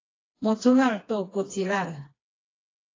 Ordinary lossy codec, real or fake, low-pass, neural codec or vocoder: AAC, 32 kbps; fake; 7.2 kHz; codec, 16 kHz, 2 kbps, FreqCodec, smaller model